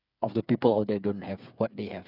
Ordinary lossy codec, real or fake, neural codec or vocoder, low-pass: none; fake; codec, 16 kHz, 8 kbps, FreqCodec, smaller model; 5.4 kHz